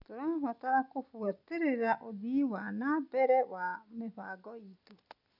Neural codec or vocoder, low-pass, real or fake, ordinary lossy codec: none; 5.4 kHz; real; none